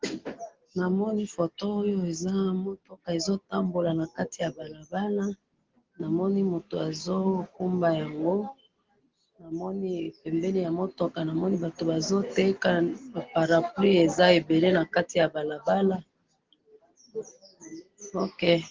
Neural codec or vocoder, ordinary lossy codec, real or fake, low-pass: none; Opus, 16 kbps; real; 7.2 kHz